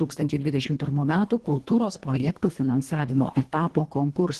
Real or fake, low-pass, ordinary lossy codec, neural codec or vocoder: fake; 10.8 kHz; Opus, 16 kbps; codec, 24 kHz, 1.5 kbps, HILCodec